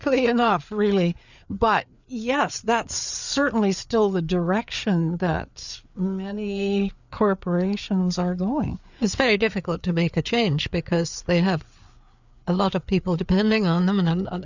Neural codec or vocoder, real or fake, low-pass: codec, 16 kHz, 4 kbps, FreqCodec, larger model; fake; 7.2 kHz